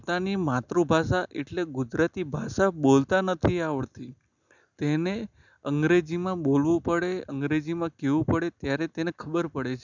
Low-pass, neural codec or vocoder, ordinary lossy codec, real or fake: 7.2 kHz; none; none; real